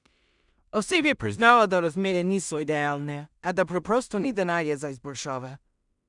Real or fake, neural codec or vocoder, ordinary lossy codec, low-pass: fake; codec, 16 kHz in and 24 kHz out, 0.4 kbps, LongCat-Audio-Codec, two codebook decoder; none; 10.8 kHz